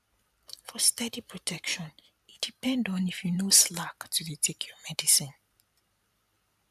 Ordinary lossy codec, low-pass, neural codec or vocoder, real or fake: none; 14.4 kHz; vocoder, 44.1 kHz, 128 mel bands every 512 samples, BigVGAN v2; fake